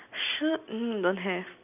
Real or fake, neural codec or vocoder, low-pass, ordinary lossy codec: real; none; 3.6 kHz; none